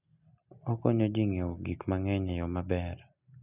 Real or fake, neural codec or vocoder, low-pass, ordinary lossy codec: real; none; 3.6 kHz; none